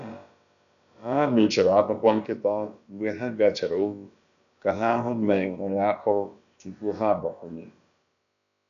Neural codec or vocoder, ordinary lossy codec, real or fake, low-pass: codec, 16 kHz, about 1 kbps, DyCAST, with the encoder's durations; none; fake; 7.2 kHz